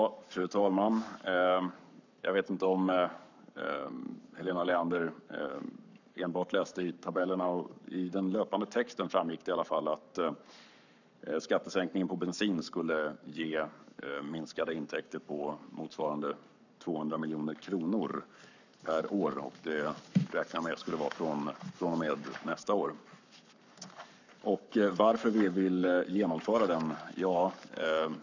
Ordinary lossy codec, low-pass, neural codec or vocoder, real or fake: none; 7.2 kHz; codec, 44.1 kHz, 7.8 kbps, Pupu-Codec; fake